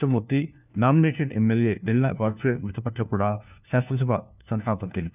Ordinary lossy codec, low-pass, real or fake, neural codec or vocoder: none; 3.6 kHz; fake; codec, 16 kHz, 1 kbps, FunCodec, trained on LibriTTS, 50 frames a second